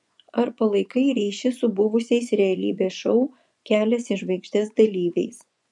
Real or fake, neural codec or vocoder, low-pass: fake; vocoder, 24 kHz, 100 mel bands, Vocos; 10.8 kHz